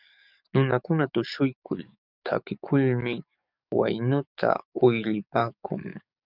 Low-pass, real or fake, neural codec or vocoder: 5.4 kHz; fake; codec, 44.1 kHz, 7.8 kbps, DAC